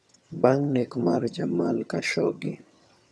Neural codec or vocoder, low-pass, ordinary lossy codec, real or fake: vocoder, 22.05 kHz, 80 mel bands, HiFi-GAN; none; none; fake